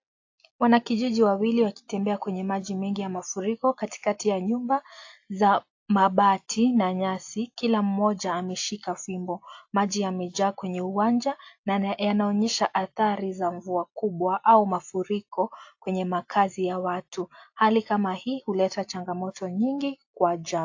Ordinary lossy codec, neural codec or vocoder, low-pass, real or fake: AAC, 48 kbps; none; 7.2 kHz; real